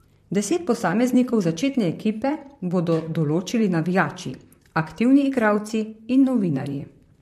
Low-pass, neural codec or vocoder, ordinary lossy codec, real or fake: 14.4 kHz; vocoder, 44.1 kHz, 128 mel bands, Pupu-Vocoder; MP3, 64 kbps; fake